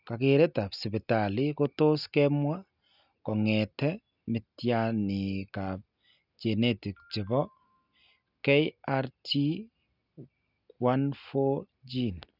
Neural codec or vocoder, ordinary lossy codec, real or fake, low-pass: none; none; real; 5.4 kHz